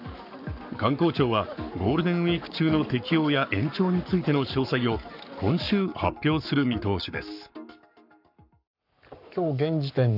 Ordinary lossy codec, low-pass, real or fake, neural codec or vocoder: none; 5.4 kHz; fake; codec, 44.1 kHz, 7.8 kbps, Pupu-Codec